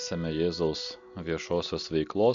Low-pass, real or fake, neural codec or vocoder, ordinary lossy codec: 7.2 kHz; real; none; Opus, 64 kbps